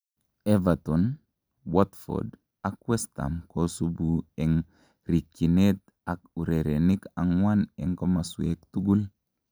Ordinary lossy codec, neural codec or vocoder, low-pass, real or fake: none; none; none; real